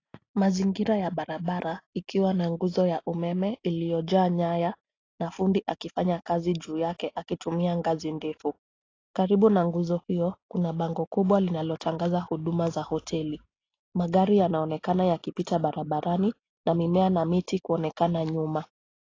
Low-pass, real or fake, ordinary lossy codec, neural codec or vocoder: 7.2 kHz; real; AAC, 32 kbps; none